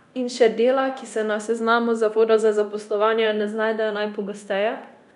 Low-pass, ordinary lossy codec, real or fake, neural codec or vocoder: 10.8 kHz; none; fake; codec, 24 kHz, 0.9 kbps, DualCodec